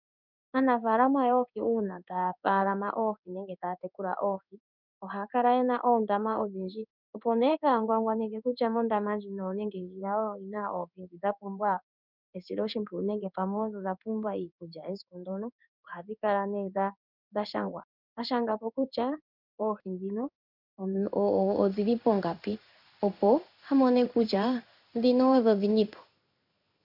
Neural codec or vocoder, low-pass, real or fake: codec, 16 kHz in and 24 kHz out, 1 kbps, XY-Tokenizer; 5.4 kHz; fake